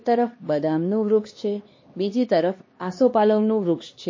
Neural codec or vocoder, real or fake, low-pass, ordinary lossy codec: codec, 16 kHz, 2 kbps, X-Codec, HuBERT features, trained on LibriSpeech; fake; 7.2 kHz; MP3, 32 kbps